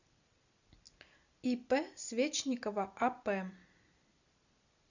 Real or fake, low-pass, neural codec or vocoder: real; 7.2 kHz; none